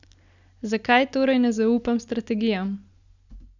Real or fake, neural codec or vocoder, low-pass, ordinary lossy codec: real; none; 7.2 kHz; none